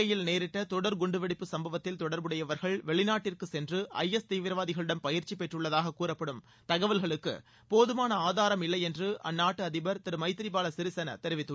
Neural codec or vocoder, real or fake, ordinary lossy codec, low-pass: none; real; none; none